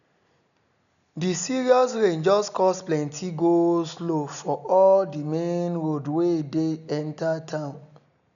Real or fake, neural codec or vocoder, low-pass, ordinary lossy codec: real; none; 7.2 kHz; none